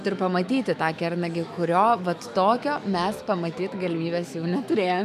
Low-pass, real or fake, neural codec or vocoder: 14.4 kHz; fake; autoencoder, 48 kHz, 128 numbers a frame, DAC-VAE, trained on Japanese speech